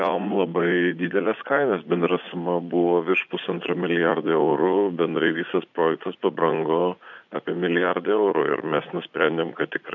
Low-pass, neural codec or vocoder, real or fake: 7.2 kHz; vocoder, 44.1 kHz, 80 mel bands, Vocos; fake